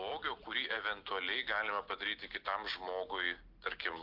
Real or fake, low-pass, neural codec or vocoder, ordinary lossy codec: real; 5.4 kHz; none; Opus, 32 kbps